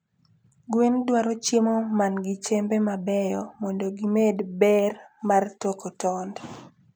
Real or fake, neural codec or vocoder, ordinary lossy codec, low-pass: real; none; none; none